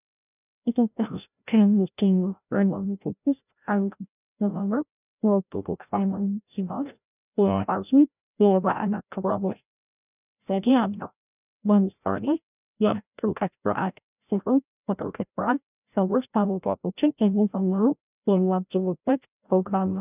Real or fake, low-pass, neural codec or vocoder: fake; 3.6 kHz; codec, 16 kHz, 0.5 kbps, FreqCodec, larger model